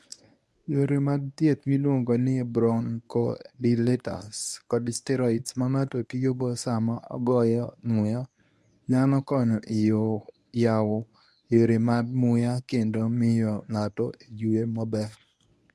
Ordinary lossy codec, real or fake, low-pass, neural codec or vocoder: none; fake; none; codec, 24 kHz, 0.9 kbps, WavTokenizer, medium speech release version 1